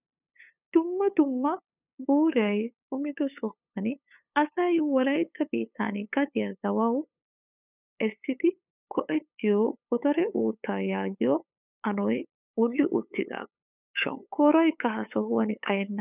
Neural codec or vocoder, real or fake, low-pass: codec, 16 kHz, 8 kbps, FunCodec, trained on LibriTTS, 25 frames a second; fake; 3.6 kHz